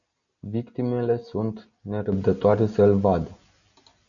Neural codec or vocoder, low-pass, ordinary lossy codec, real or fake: none; 7.2 kHz; MP3, 96 kbps; real